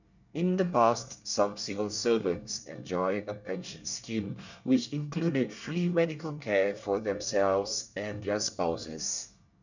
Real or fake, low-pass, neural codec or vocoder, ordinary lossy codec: fake; 7.2 kHz; codec, 24 kHz, 1 kbps, SNAC; none